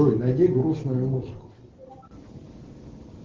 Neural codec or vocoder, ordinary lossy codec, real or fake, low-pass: none; Opus, 16 kbps; real; 7.2 kHz